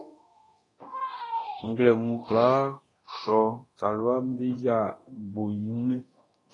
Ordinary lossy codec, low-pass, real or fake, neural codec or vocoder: AAC, 32 kbps; 10.8 kHz; fake; codec, 24 kHz, 0.9 kbps, DualCodec